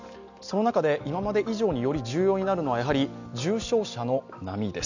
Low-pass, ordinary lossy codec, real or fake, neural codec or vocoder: 7.2 kHz; none; real; none